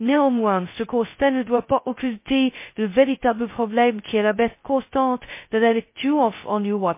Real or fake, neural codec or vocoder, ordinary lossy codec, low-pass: fake; codec, 16 kHz, 0.2 kbps, FocalCodec; MP3, 24 kbps; 3.6 kHz